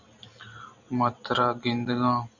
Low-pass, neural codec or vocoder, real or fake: 7.2 kHz; none; real